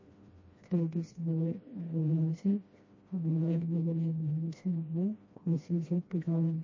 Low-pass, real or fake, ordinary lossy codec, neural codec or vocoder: 7.2 kHz; fake; MP3, 32 kbps; codec, 16 kHz, 1 kbps, FreqCodec, smaller model